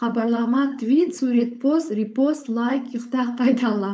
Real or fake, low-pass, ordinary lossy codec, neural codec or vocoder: fake; none; none; codec, 16 kHz, 4.8 kbps, FACodec